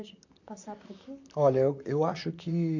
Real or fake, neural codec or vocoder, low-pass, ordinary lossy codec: fake; vocoder, 44.1 kHz, 128 mel bands, Pupu-Vocoder; 7.2 kHz; none